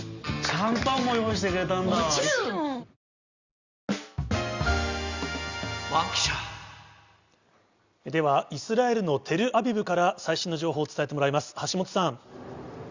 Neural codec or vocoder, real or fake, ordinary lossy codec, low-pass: none; real; Opus, 64 kbps; 7.2 kHz